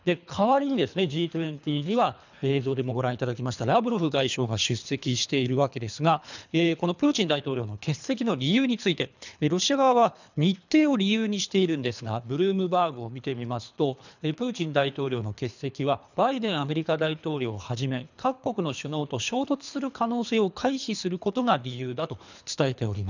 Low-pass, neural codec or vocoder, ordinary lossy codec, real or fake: 7.2 kHz; codec, 24 kHz, 3 kbps, HILCodec; none; fake